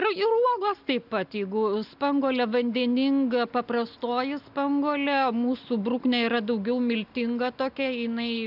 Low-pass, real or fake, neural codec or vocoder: 5.4 kHz; real; none